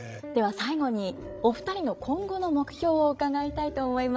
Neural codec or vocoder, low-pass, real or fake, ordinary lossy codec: codec, 16 kHz, 16 kbps, FreqCodec, larger model; none; fake; none